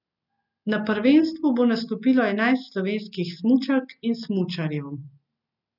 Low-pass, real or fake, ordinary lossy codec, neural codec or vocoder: 5.4 kHz; real; none; none